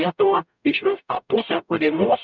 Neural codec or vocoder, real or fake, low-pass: codec, 44.1 kHz, 0.9 kbps, DAC; fake; 7.2 kHz